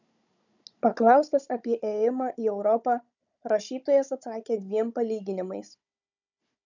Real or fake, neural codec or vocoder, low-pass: fake; codec, 16 kHz, 16 kbps, FunCodec, trained on Chinese and English, 50 frames a second; 7.2 kHz